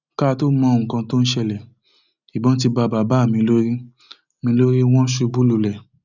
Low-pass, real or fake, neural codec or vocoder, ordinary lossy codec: 7.2 kHz; real; none; none